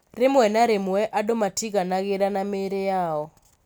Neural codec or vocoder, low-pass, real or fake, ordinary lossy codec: none; none; real; none